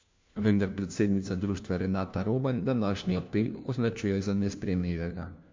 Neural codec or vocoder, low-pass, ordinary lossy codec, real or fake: codec, 16 kHz, 1 kbps, FunCodec, trained on LibriTTS, 50 frames a second; 7.2 kHz; MP3, 64 kbps; fake